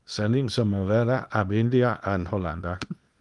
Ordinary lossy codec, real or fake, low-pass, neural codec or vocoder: Opus, 32 kbps; fake; 10.8 kHz; codec, 24 kHz, 0.9 kbps, WavTokenizer, small release